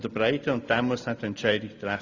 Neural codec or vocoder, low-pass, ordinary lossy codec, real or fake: none; 7.2 kHz; Opus, 64 kbps; real